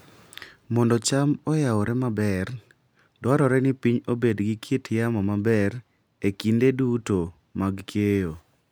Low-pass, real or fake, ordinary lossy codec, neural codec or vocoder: none; real; none; none